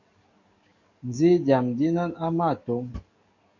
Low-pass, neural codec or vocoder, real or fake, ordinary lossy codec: 7.2 kHz; codec, 44.1 kHz, 7.8 kbps, DAC; fake; MP3, 64 kbps